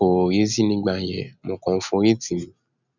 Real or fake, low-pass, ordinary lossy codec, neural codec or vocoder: real; 7.2 kHz; none; none